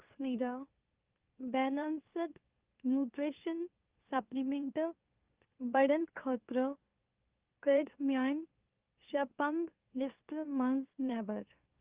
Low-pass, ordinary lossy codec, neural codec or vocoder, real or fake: 3.6 kHz; Opus, 32 kbps; autoencoder, 44.1 kHz, a latent of 192 numbers a frame, MeloTTS; fake